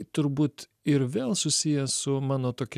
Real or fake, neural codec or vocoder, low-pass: real; none; 14.4 kHz